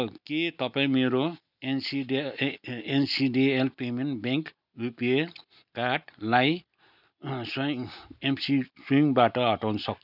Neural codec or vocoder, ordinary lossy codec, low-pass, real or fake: none; none; 5.4 kHz; real